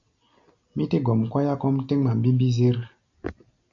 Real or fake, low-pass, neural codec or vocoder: real; 7.2 kHz; none